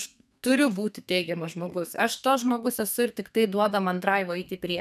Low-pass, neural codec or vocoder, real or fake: 14.4 kHz; codec, 32 kHz, 1.9 kbps, SNAC; fake